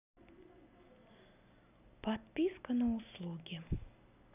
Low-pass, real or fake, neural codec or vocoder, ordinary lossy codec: 3.6 kHz; real; none; none